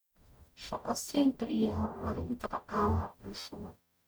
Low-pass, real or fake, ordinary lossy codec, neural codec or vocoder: none; fake; none; codec, 44.1 kHz, 0.9 kbps, DAC